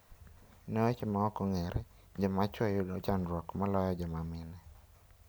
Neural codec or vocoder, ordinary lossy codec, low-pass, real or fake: none; none; none; real